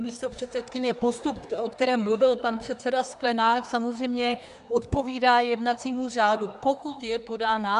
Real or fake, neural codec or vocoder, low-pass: fake; codec, 24 kHz, 1 kbps, SNAC; 10.8 kHz